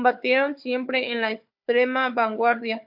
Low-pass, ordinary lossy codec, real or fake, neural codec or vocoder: 5.4 kHz; MP3, 48 kbps; fake; codec, 16 kHz, 4 kbps, FunCodec, trained on Chinese and English, 50 frames a second